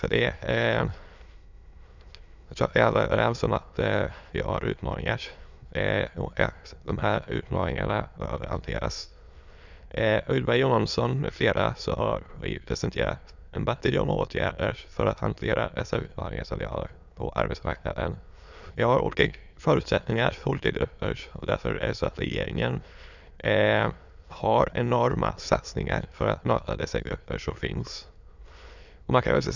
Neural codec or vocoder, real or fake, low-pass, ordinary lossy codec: autoencoder, 22.05 kHz, a latent of 192 numbers a frame, VITS, trained on many speakers; fake; 7.2 kHz; none